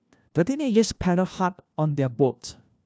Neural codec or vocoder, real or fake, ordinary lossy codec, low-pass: codec, 16 kHz, 1 kbps, FunCodec, trained on LibriTTS, 50 frames a second; fake; none; none